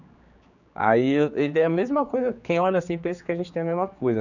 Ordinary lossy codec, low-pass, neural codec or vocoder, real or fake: none; 7.2 kHz; codec, 16 kHz, 4 kbps, X-Codec, HuBERT features, trained on general audio; fake